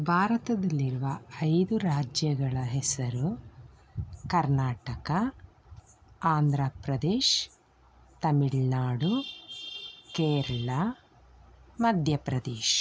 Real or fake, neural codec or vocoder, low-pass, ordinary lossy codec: real; none; none; none